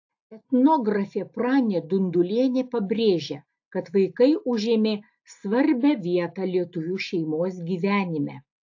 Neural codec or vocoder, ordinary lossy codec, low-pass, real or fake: none; AAC, 48 kbps; 7.2 kHz; real